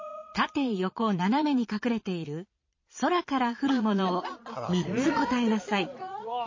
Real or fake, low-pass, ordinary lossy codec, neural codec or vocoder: fake; 7.2 kHz; MP3, 32 kbps; vocoder, 22.05 kHz, 80 mel bands, Vocos